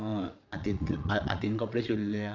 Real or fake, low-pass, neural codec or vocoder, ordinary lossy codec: fake; 7.2 kHz; vocoder, 22.05 kHz, 80 mel bands, Vocos; none